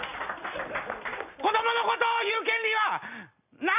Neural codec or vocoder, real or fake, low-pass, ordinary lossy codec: none; real; 3.6 kHz; none